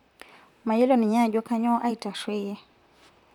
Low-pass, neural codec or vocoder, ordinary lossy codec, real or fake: 19.8 kHz; vocoder, 44.1 kHz, 128 mel bands, Pupu-Vocoder; none; fake